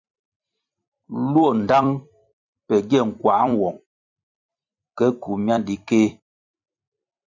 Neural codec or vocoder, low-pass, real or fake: vocoder, 44.1 kHz, 128 mel bands every 256 samples, BigVGAN v2; 7.2 kHz; fake